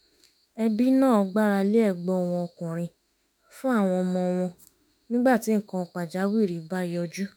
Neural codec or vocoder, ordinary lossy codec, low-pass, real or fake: autoencoder, 48 kHz, 32 numbers a frame, DAC-VAE, trained on Japanese speech; none; none; fake